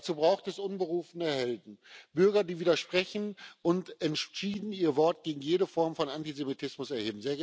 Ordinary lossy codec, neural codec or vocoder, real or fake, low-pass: none; none; real; none